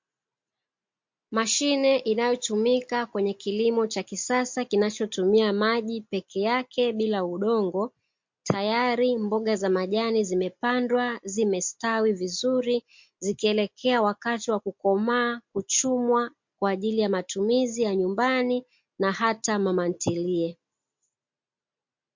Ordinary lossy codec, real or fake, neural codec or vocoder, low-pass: MP3, 48 kbps; real; none; 7.2 kHz